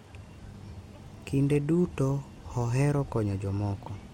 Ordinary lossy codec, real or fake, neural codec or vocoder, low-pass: MP3, 64 kbps; real; none; 19.8 kHz